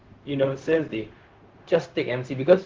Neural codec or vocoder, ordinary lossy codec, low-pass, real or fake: codec, 16 kHz, 0.4 kbps, LongCat-Audio-Codec; Opus, 16 kbps; 7.2 kHz; fake